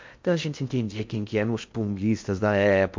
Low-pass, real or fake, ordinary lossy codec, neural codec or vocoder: 7.2 kHz; fake; MP3, 48 kbps; codec, 16 kHz in and 24 kHz out, 0.8 kbps, FocalCodec, streaming, 65536 codes